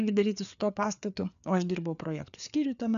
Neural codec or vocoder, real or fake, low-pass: codec, 16 kHz, 4 kbps, FreqCodec, larger model; fake; 7.2 kHz